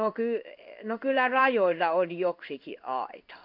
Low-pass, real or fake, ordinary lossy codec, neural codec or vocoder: 5.4 kHz; fake; none; codec, 16 kHz in and 24 kHz out, 1 kbps, XY-Tokenizer